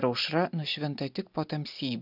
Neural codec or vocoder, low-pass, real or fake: none; 5.4 kHz; real